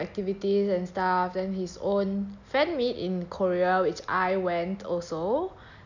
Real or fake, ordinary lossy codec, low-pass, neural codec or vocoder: real; none; 7.2 kHz; none